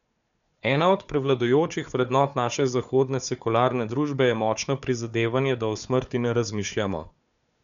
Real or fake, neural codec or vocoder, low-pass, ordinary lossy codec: fake; codec, 16 kHz, 4 kbps, FunCodec, trained on Chinese and English, 50 frames a second; 7.2 kHz; none